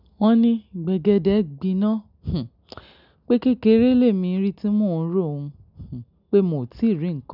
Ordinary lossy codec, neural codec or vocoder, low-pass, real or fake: none; none; 5.4 kHz; real